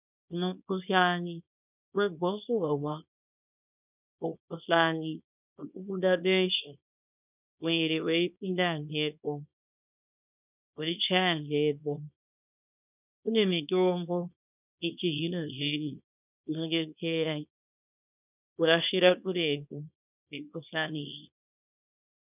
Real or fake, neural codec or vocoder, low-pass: fake; codec, 24 kHz, 0.9 kbps, WavTokenizer, small release; 3.6 kHz